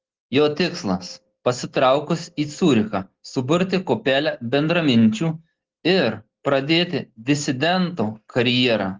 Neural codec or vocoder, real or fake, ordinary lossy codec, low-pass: none; real; Opus, 16 kbps; 7.2 kHz